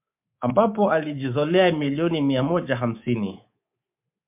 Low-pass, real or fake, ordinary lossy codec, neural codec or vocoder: 3.6 kHz; fake; MP3, 32 kbps; codec, 24 kHz, 3.1 kbps, DualCodec